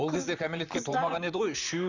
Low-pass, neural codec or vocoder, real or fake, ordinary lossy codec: 7.2 kHz; vocoder, 44.1 kHz, 128 mel bands, Pupu-Vocoder; fake; none